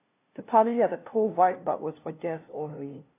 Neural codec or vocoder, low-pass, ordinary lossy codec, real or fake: codec, 16 kHz, 0.5 kbps, FunCodec, trained on LibriTTS, 25 frames a second; 3.6 kHz; AAC, 24 kbps; fake